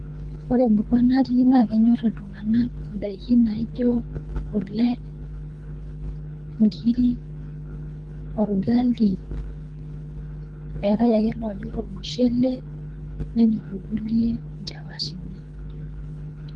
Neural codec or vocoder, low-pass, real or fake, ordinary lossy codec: codec, 24 kHz, 3 kbps, HILCodec; 9.9 kHz; fake; Opus, 24 kbps